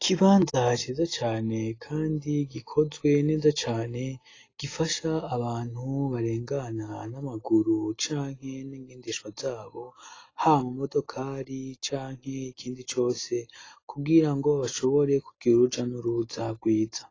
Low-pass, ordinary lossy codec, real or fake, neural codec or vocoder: 7.2 kHz; AAC, 32 kbps; real; none